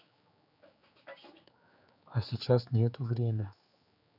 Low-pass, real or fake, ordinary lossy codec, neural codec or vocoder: 5.4 kHz; fake; none; codec, 16 kHz, 4 kbps, X-Codec, HuBERT features, trained on general audio